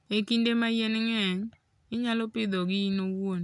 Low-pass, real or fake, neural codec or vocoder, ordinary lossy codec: 10.8 kHz; real; none; none